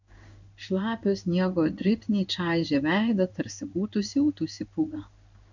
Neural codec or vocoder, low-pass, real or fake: codec, 16 kHz in and 24 kHz out, 1 kbps, XY-Tokenizer; 7.2 kHz; fake